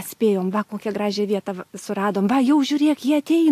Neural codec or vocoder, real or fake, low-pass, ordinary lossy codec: none; real; 14.4 kHz; AAC, 64 kbps